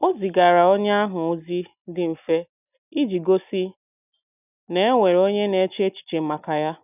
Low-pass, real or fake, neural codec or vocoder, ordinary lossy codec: 3.6 kHz; real; none; none